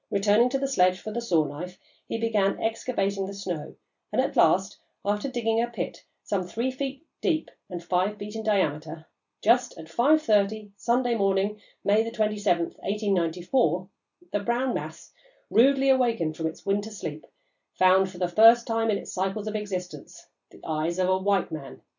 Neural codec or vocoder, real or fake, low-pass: none; real; 7.2 kHz